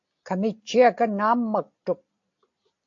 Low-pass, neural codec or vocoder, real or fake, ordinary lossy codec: 7.2 kHz; none; real; AAC, 48 kbps